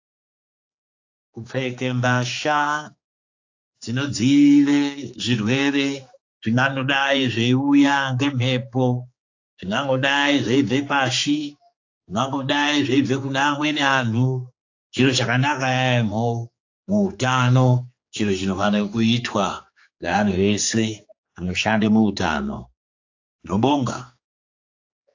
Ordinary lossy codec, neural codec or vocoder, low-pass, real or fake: AAC, 48 kbps; codec, 16 kHz, 4 kbps, X-Codec, HuBERT features, trained on general audio; 7.2 kHz; fake